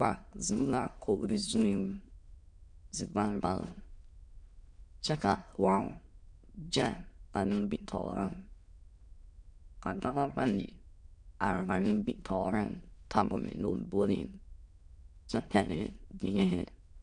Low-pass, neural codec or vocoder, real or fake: 9.9 kHz; autoencoder, 22.05 kHz, a latent of 192 numbers a frame, VITS, trained on many speakers; fake